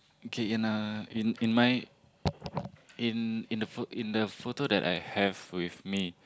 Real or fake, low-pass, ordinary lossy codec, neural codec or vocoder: real; none; none; none